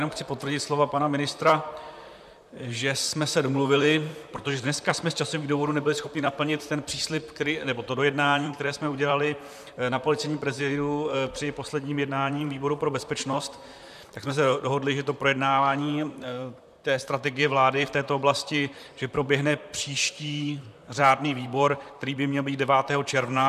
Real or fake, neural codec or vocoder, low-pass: fake; vocoder, 44.1 kHz, 128 mel bands, Pupu-Vocoder; 14.4 kHz